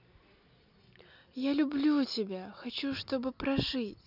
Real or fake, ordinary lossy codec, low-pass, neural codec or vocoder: real; none; 5.4 kHz; none